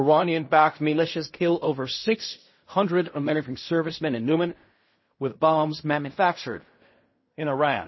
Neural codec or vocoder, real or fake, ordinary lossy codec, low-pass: codec, 16 kHz in and 24 kHz out, 0.4 kbps, LongCat-Audio-Codec, fine tuned four codebook decoder; fake; MP3, 24 kbps; 7.2 kHz